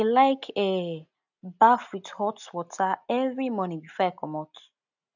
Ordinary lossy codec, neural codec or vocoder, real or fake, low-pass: none; none; real; 7.2 kHz